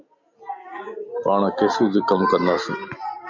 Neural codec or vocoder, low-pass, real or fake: none; 7.2 kHz; real